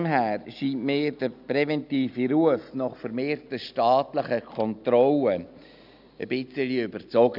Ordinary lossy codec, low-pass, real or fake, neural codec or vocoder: none; 5.4 kHz; real; none